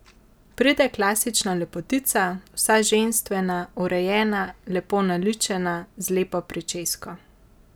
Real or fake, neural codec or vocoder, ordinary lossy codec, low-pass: real; none; none; none